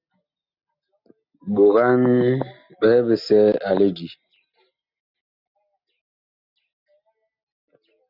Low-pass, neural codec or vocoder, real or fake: 5.4 kHz; none; real